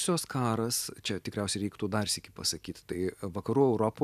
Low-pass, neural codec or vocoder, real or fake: 14.4 kHz; none; real